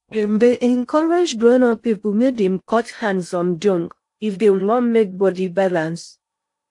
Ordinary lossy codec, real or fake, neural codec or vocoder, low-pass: AAC, 64 kbps; fake; codec, 16 kHz in and 24 kHz out, 0.6 kbps, FocalCodec, streaming, 2048 codes; 10.8 kHz